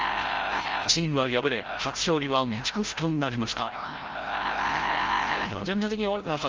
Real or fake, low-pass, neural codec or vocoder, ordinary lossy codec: fake; 7.2 kHz; codec, 16 kHz, 0.5 kbps, FreqCodec, larger model; Opus, 24 kbps